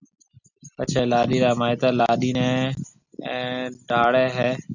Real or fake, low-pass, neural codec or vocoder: real; 7.2 kHz; none